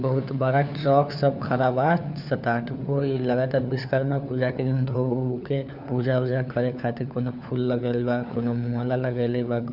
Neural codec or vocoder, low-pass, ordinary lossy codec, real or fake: codec, 16 kHz, 4 kbps, FunCodec, trained on LibriTTS, 50 frames a second; 5.4 kHz; none; fake